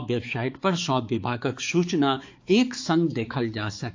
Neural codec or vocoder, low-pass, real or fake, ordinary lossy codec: codec, 16 kHz, 4 kbps, X-Codec, HuBERT features, trained on balanced general audio; 7.2 kHz; fake; AAC, 48 kbps